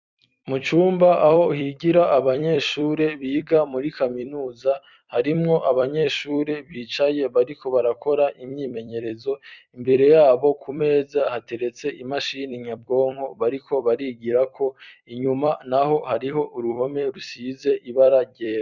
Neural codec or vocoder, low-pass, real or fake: vocoder, 44.1 kHz, 128 mel bands every 256 samples, BigVGAN v2; 7.2 kHz; fake